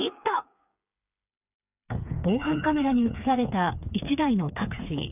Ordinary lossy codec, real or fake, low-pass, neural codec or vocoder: none; fake; 3.6 kHz; codec, 16 kHz, 4 kbps, FreqCodec, smaller model